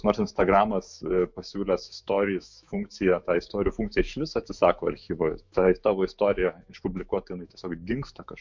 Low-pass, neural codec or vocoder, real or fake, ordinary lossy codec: 7.2 kHz; autoencoder, 48 kHz, 128 numbers a frame, DAC-VAE, trained on Japanese speech; fake; MP3, 64 kbps